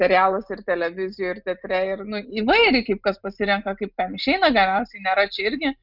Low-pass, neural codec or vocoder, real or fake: 5.4 kHz; none; real